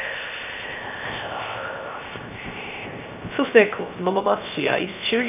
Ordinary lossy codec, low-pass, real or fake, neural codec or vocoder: none; 3.6 kHz; fake; codec, 16 kHz, 0.3 kbps, FocalCodec